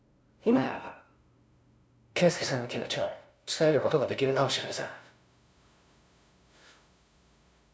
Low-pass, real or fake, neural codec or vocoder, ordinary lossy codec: none; fake; codec, 16 kHz, 0.5 kbps, FunCodec, trained on LibriTTS, 25 frames a second; none